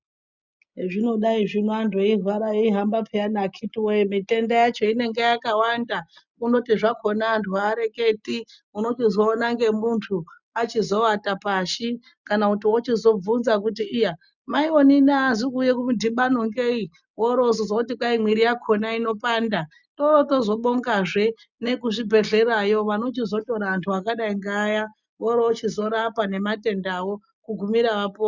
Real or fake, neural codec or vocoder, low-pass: real; none; 7.2 kHz